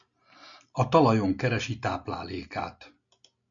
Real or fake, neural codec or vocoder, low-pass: real; none; 7.2 kHz